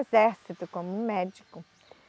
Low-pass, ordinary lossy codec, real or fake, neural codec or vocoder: none; none; real; none